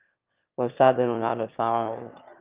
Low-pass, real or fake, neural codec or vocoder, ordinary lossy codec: 3.6 kHz; fake; autoencoder, 22.05 kHz, a latent of 192 numbers a frame, VITS, trained on one speaker; Opus, 24 kbps